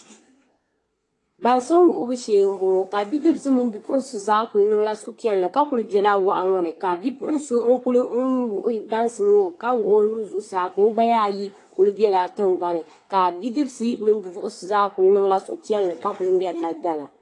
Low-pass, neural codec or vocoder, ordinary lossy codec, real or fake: 10.8 kHz; codec, 24 kHz, 1 kbps, SNAC; AAC, 48 kbps; fake